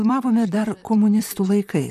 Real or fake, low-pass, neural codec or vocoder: fake; 14.4 kHz; vocoder, 44.1 kHz, 128 mel bands, Pupu-Vocoder